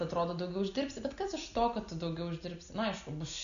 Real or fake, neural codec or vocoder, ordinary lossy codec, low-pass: real; none; MP3, 96 kbps; 7.2 kHz